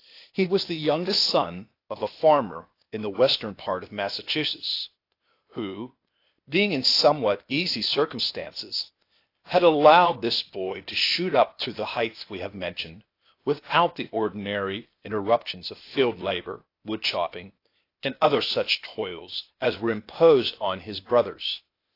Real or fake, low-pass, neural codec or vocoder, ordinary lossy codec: fake; 5.4 kHz; codec, 16 kHz, 0.8 kbps, ZipCodec; AAC, 32 kbps